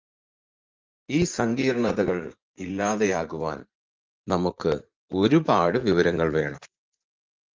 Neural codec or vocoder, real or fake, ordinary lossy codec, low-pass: vocoder, 44.1 kHz, 80 mel bands, Vocos; fake; Opus, 32 kbps; 7.2 kHz